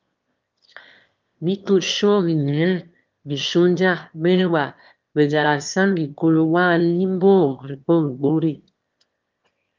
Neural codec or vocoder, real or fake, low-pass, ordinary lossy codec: autoencoder, 22.05 kHz, a latent of 192 numbers a frame, VITS, trained on one speaker; fake; 7.2 kHz; Opus, 24 kbps